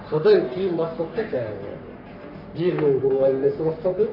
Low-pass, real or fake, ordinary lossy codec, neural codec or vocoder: 5.4 kHz; fake; none; codec, 44.1 kHz, 3.4 kbps, Pupu-Codec